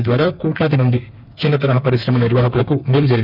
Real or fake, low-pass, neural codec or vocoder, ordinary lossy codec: fake; 5.4 kHz; codec, 32 kHz, 1.9 kbps, SNAC; none